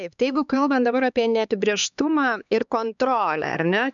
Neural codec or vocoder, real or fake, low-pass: codec, 16 kHz, 4 kbps, X-Codec, HuBERT features, trained on LibriSpeech; fake; 7.2 kHz